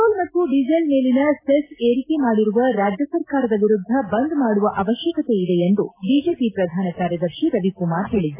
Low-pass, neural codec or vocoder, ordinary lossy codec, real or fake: 3.6 kHz; none; AAC, 24 kbps; real